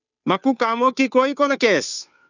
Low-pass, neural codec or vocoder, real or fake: 7.2 kHz; codec, 16 kHz, 2 kbps, FunCodec, trained on Chinese and English, 25 frames a second; fake